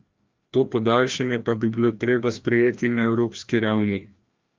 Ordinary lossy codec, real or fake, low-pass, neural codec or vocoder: Opus, 24 kbps; fake; 7.2 kHz; codec, 16 kHz, 1 kbps, FreqCodec, larger model